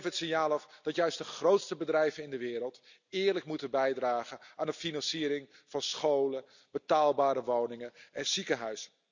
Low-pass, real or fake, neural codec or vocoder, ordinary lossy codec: 7.2 kHz; real; none; none